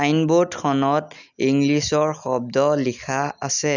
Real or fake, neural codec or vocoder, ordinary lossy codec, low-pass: real; none; none; 7.2 kHz